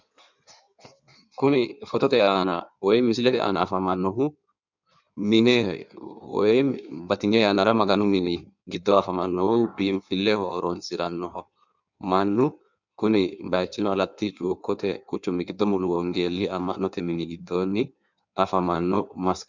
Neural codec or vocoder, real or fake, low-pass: codec, 16 kHz in and 24 kHz out, 1.1 kbps, FireRedTTS-2 codec; fake; 7.2 kHz